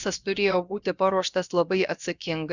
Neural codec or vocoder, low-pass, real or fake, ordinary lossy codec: codec, 16 kHz, about 1 kbps, DyCAST, with the encoder's durations; 7.2 kHz; fake; Opus, 64 kbps